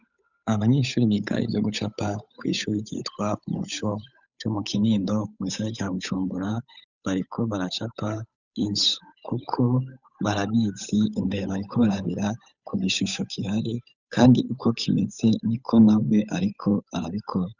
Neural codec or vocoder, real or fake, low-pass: codec, 16 kHz, 8 kbps, FunCodec, trained on Chinese and English, 25 frames a second; fake; 7.2 kHz